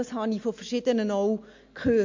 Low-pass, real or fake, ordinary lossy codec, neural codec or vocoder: 7.2 kHz; real; MP3, 48 kbps; none